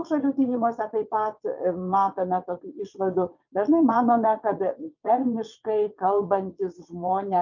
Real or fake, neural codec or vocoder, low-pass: fake; vocoder, 22.05 kHz, 80 mel bands, WaveNeXt; 7.2 kHz